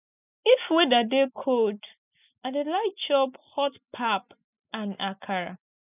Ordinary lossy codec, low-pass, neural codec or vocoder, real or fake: none; 3.6 kHz; none; real